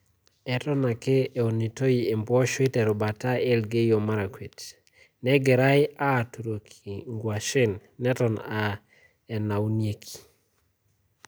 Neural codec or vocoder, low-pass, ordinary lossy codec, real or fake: none; none; none; real